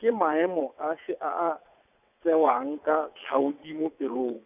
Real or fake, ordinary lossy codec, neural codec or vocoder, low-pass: fake; none; vocoder, 22.05 kHz, 80 mel bands, WaveNeXt; 3.6 kHz